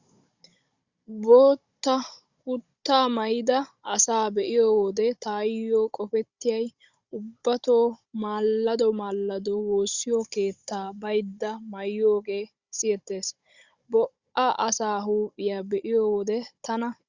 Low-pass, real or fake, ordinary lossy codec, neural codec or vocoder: 7.2 kHz; fake; Opus, 64 kbps; codec, 16 kHz, 16 kbps, FunCodec, trained on Chinese and English, 50 frames a second